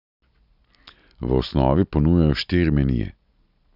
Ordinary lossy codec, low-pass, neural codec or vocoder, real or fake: none; 5.4 kHz; none; real